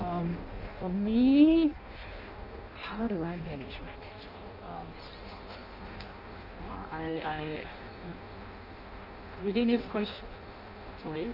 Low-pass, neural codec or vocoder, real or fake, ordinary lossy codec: 5.4 kHz; codec, 16 kHz in and 24 kHz out, 0.6 kbps, FireRedTTS-2 codec; fake; none